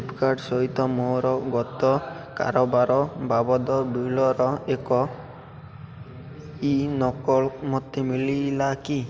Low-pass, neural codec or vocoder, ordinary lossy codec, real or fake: none; none; none; real